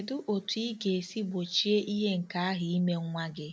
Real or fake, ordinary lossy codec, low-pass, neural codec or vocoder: real; none; none; none